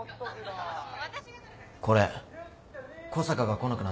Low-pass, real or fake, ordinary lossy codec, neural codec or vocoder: none; real; none; none